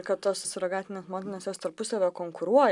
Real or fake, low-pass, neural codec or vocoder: real; 10.8 kHz; none